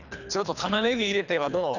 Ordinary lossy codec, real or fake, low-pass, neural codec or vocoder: none; fake; 7.2 kHz; codec, 24 kHz, 3 kbps, HILCodec